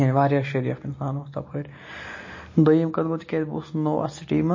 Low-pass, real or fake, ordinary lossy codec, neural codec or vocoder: 7.2 kHz; real; MP3, 32 kbps; none